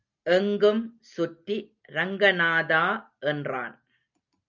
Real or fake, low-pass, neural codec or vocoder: real; 7.2 kHz; none